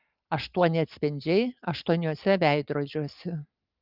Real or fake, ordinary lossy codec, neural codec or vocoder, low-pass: real; Opus, 24 kbps; none; 5.4 kHz